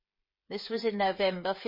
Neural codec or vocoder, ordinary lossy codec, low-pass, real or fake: codec, 16 kHz, 16 kbps, FreqCodec, smaller model; MP3, 24 kbps; 5.4 kHz; fake